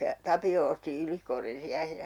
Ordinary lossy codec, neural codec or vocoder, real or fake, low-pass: none; none; real; 19.8 kHz